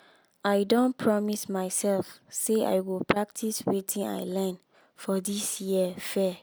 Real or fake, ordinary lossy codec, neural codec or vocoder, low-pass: real; none; none; none